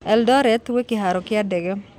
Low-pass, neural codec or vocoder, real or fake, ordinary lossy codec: 19.8 kHz; none; real; none